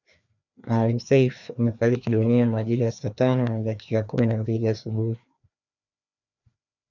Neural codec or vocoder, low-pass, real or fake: codec, 16 kHz, 2 kbps, FreqCodec, larger model; 7.2 kHz; fake